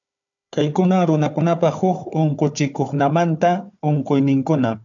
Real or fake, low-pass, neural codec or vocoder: fake; 7.2 kHz; codec, 16 kHz, 4 kbps, FunCodec, trained on Chinese and English, 50 frames a second